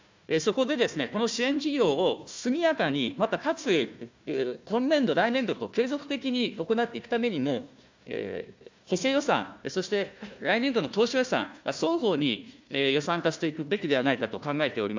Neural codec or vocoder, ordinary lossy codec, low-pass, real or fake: codec, 16 kHz, 1 kbps, FunCodec, trained on Chinese and English, 50 frames a second; MP3, 64 kbps; 7.2 kHz; fake